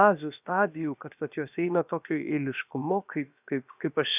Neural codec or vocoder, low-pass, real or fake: codec, 16 kHz, about 1 kbps, DyCAST, with the encoder's durations; 3.6 kHz; fake